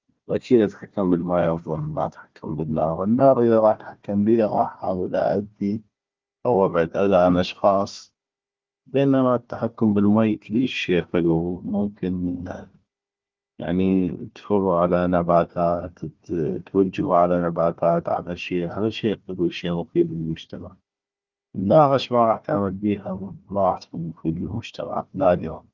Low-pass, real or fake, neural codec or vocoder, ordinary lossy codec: 7.2 kHz; fake; codec, 16 kHz, 1 kbps, FunCodec, trained on Chinese and English, 50 frames a second; Opus, 32 kbps